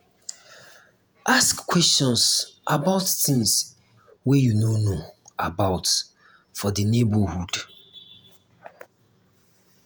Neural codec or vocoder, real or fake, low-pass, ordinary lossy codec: vocoder, 48 kHz, 128 mel bands, Vocos; fake; none; none